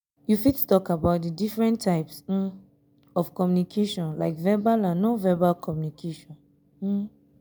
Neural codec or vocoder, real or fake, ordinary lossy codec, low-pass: none; real; none; none